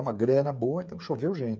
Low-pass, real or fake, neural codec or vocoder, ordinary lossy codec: none; fake; codec, 16 kHz, 8 kbps, FreqCodec, smaller model; none